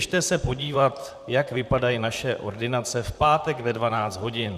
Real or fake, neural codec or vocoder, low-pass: fake; vocoder, 44.1 kHz, 128 mel bands, Pupu-Vocoder; 14.4 kHz